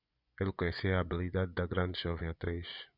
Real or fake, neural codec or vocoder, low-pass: fake; vocoder, 24 kHz, 100 mel bands, Vocos; 5.4 kHz